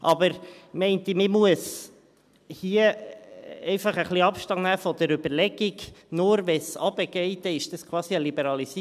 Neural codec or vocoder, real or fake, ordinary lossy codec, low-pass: none; real; none; 14.4 kHz